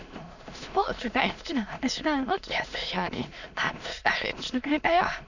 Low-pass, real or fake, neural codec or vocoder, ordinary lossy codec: 7.2 kHz; fake; autoencoder, 22.05 kHz, a latent of 192 numbers a frame, VITS, trained on many speakers; none